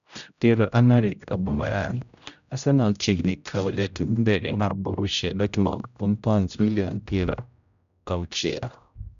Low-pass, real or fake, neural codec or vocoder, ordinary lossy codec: 7.2 kHz; fake; codec, 16 kHz, 0.5 kbps, X-Codec, HuBERT features, trained on general audio; none